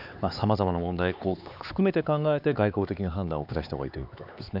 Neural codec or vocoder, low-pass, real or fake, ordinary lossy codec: codec, 16 kHz, 4 kbps, X-Codec, HuBERT features, trained on LibriSpeech; 5.4 kHz; fake; none